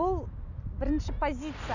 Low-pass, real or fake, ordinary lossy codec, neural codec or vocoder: 7.2 kHz; real; none; none